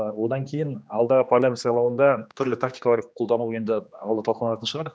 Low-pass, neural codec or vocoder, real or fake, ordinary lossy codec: none; codec, 16 kHz, 2 kbps, X-Codec, HuBERT features, trained on general audio; fake; none